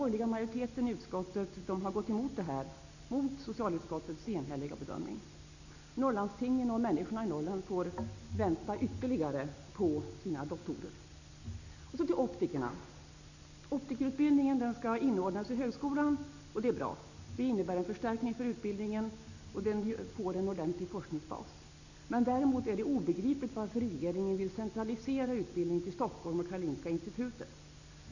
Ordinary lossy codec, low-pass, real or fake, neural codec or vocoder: none; 7.2 kHz; real; none